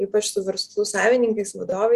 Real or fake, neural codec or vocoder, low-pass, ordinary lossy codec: real; none; 14.4 kHz; Opus, 64 kbps